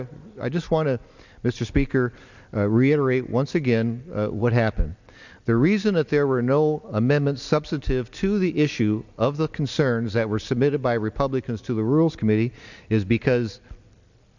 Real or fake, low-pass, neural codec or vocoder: real; 7.2 kHz; none